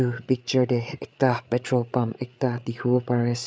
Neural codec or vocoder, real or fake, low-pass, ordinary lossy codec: codec, 16 kHz, 8 kbps, FreqCodec, larger model; fake; none; none